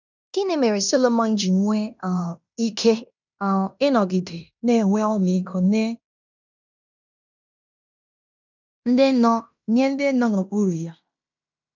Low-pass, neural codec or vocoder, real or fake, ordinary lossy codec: 7.2 kHz; codec, 16 kHz in and 24 kHz out, 0.9 kbps, LongCat-Audio-Codec, fine tuned four codebook decoder; fake; none